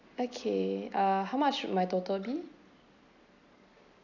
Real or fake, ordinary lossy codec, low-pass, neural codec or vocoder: real; none; 7.2 kHz; none